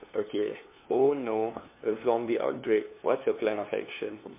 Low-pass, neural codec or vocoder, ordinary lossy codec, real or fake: 3.6 kHz; codec, 16 kHz, 2 kbps, FunCodec, trained on LibriTTS, 25 frames a second; MP3, 24 kbps; fake